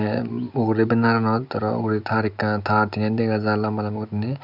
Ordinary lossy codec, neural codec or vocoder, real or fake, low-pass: none; none; real; 5.4 kHz